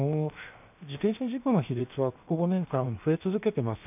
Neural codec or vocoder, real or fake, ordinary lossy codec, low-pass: codec, 16 kHz, 0.7 kbps, FocalCodec; fake; none; 3.6 kHz